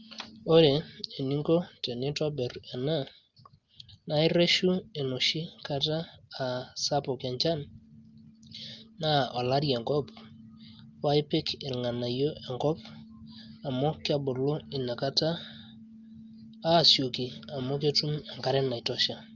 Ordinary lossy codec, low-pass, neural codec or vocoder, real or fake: Opus, 24 kbps; 7.2 kHz; none; real